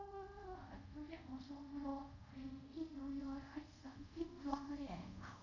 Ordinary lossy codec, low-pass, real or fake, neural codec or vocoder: none; 7.2 kHz; fake; codec, 24 kHz, 0.5 kbps, DualCodec